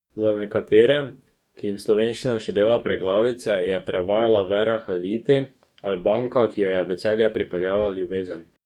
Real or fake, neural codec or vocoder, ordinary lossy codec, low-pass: fake; codec, 44.1 kHz, 2.6 kbps, DAC; none; 19.8 kHz